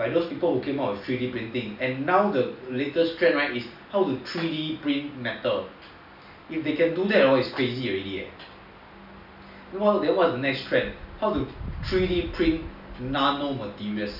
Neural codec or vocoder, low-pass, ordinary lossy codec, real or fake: none; 5.4 kHz; none; real